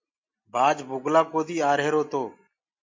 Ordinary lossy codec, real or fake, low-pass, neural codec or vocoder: MP3, 48 kbps; real; 7.2 kHz; none